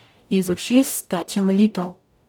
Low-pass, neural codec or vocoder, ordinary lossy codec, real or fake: none; codec, 44.1 kHz, 0.9 kbps, DAC; none; fake